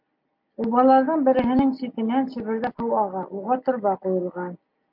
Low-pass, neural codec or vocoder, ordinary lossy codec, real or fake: 5.4 kHz; none; MP3, 48 kbps; real